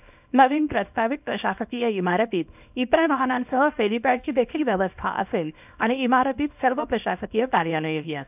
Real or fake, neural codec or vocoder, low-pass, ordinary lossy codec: fake; codec, 24 kHz, 0.9 kbps, WavTokenizer, small release; 3.6 kHz; none